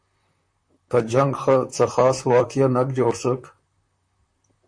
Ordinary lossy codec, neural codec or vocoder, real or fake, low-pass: MP3, 48 kbps; vocoder, 44.1 kHz, 128 mel bands, Pupu-Vocoder; fake; 9.9 kHz